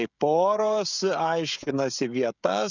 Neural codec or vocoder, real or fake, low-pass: codec, 16 kHz, 16 kbps, FreqCodec, smaller model; fake; 7.2 kHz